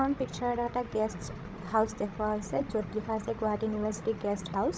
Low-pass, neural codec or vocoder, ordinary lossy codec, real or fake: none; codec, 16 kHz, 16 kbps, FreqCodec, larger model; none; fake